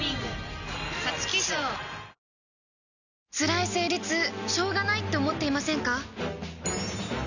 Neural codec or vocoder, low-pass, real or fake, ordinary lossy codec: none; 7.2 kHz; real; none